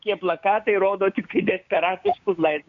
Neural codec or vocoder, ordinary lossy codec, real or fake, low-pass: codec, 16 kHz, 6 kbps, DAC; MP3, 96 kbps; fake; 7.2 kHz